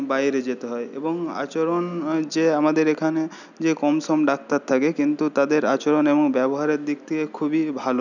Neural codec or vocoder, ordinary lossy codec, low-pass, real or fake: none; none; 7.2 kHz; real